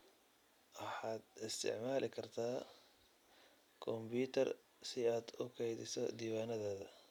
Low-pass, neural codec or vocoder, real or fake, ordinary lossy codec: 19.8 kHz; none; real; none